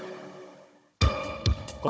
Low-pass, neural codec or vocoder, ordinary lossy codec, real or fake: none; codec, 16 kHz, 16 kbps, FunCodec, trained on Chinese and English, 50 frames a second; none; fake